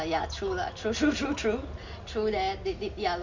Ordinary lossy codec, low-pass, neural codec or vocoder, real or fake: Opus, 64 kbps; 7.2 kHz; none; real